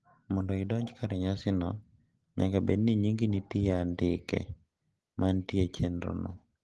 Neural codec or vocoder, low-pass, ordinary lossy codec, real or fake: none; 10.8 kHz; Opus, 16 kbps; real